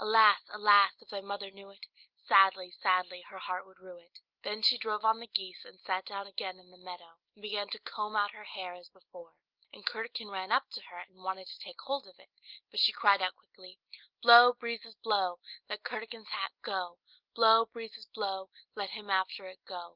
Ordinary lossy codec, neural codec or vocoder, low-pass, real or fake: Opus, 32 kbps; none; 5.4 kHz; real